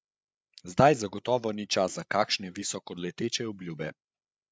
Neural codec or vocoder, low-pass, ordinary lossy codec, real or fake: codec, 16 kHz, 8 kbps, FreqCodec, larger model; none; none; fake